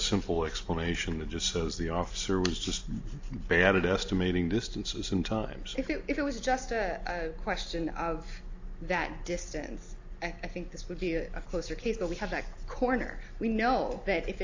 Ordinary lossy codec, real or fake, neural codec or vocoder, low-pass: AAC, 48 kbps; real; none; 7.2 kHz